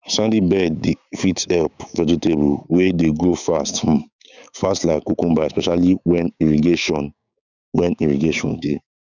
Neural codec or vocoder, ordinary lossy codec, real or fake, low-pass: codec, 16 kHz, 6 kbps, DAC; none; fake; 7.2 kHz